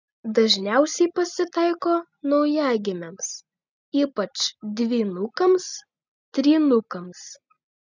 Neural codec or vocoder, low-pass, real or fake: none; 7.2 kHz; real